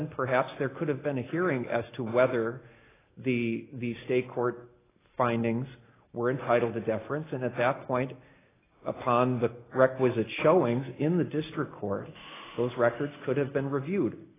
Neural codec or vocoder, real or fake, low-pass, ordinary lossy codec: none; real; 3.6 kHz; AAC, 16 kbps